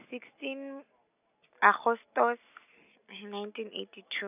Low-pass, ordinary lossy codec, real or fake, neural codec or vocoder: 3.6 kHz; none; real; none